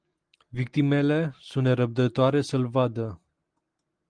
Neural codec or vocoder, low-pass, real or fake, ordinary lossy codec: none; 9.9 kHz; real; Opus, 24 kbps